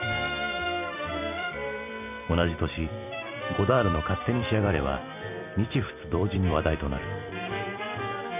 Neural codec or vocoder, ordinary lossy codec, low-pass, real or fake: vocoder, 44.1 kHz, 128 mel bands every 256 samples, BigVGAN v2; none; 3.6 kHz; fake